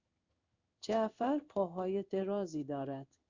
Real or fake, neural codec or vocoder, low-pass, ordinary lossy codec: fake; codec, 16 kHz in and 24 kHz out, 1 kbps, XY-Tokenizer; 7.2 kHz; Opus, 64 kbps